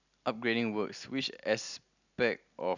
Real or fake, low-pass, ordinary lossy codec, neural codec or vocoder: real; 7.2 kHz; none; none